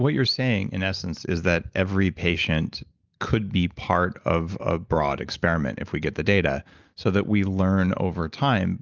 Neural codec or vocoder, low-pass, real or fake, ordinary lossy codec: none; 7.2 kHz; real; Opus, 24 kbps